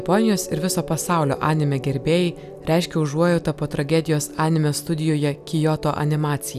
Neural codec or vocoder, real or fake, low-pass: none; real; 14.4 kHz